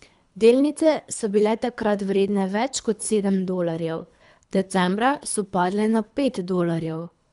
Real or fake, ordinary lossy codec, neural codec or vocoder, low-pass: fake; none; codec, 24 kHz, 3 kbps, HILCodec; 10.8 kHz